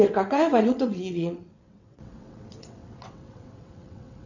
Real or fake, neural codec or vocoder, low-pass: fake; vocoder, 22.05 kHz, 80 mel bands, WaveNeXt; 7.2 kHz